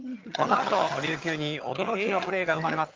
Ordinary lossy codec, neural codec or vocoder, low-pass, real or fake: Opus, 24 kbps; vocoder, 22.05 kHz, 80 mel bands, HiFi-GAN; 7.2 kHz; fake